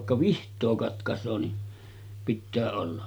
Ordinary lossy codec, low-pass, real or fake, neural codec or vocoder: none; none; real; none